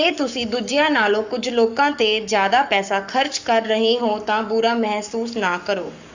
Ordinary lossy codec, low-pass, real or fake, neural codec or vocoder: Opus, 64 kbps; 7.2 kHz; fake; codec, 44.1 kHz, 7.8 kbps, Pupu-Codec